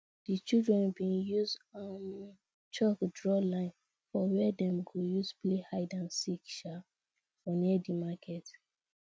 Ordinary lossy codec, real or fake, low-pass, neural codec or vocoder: none; real; none; none